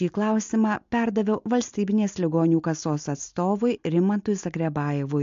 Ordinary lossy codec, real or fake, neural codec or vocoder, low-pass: MP3, 48 kbps; real; none; 7.2 kHz